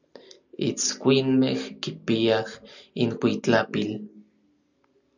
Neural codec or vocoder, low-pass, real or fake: none; 7.2 kHz; real